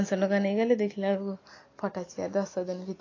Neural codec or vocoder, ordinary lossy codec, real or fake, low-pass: none; AAC, 32 kbps; real; 7.2 kHz